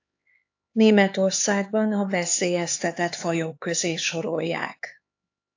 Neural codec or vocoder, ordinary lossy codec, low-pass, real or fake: codec, 16 kHz, 4 kbps, X-Codec, HuBERT features, trained on LibriSpeech; AAC, 48 kbps; 7.2 kHz; fake